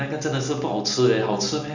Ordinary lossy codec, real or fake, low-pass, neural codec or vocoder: none; real; 7.2 kHz; none